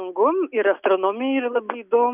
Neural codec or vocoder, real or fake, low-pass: none; real; 3.6 kHz